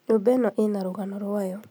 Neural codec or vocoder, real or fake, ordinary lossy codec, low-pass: none; real; none; none